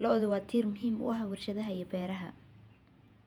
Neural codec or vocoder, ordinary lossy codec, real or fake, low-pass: vocoder, 48 kHz, 128 mel bands, Vocos; none; fake; 19.8 kHz